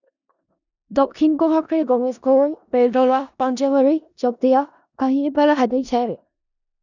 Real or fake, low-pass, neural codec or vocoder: fake; 7.2 kHz; codec, 16 kHz in and 24 kHz out, 0.4 kbps, LongCat-Audio-Codec, four codebook decoder